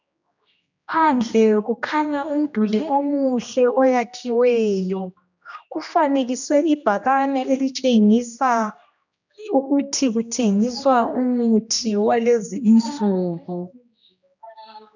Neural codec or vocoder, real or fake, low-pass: codec, 16 kHz, 1 kbps, X-Codec, HuBERT features, trained on general audio; fake; 7.2 kHz